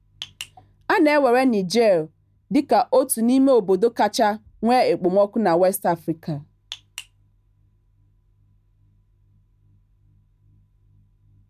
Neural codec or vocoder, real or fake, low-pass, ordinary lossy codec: none; real; 14.4 kHz; none